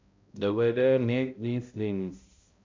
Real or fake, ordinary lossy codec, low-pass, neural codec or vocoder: fake; MP3, 64 kbps; 7.2 kHz; codec, 16 kHz, 0.5 kbps, X-Codec, HuBERT features, trained on balanced general audio